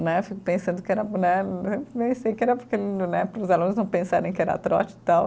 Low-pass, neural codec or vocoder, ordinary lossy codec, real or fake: none; none; none; real